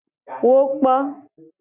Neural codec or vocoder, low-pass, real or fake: none; 3.6 kHz; real